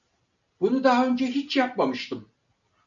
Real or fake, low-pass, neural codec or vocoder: real; 7.2 kHz; none